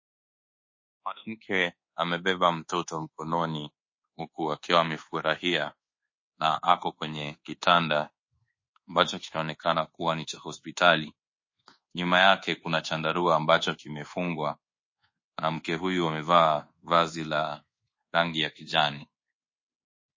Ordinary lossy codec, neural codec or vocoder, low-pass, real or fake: MP3, 32 kbps; codec, 24 kHz, 1.2 kbps, DualCodec; 7.2 kHz; fake